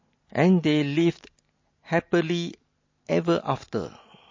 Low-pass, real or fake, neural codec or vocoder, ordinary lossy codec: 7.2 kHz; real; none; MP3, 32 kbps